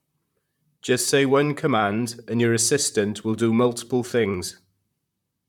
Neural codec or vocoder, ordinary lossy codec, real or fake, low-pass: vocoder, 44.1 kHz, 128 mel bands, Pupu-Vocoder; none; fake; 19.8 kHz